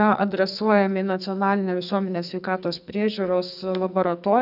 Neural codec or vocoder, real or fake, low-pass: codec, 44.1 kHz, 2.6 kbps, SNAC; fake; 5.4 kHz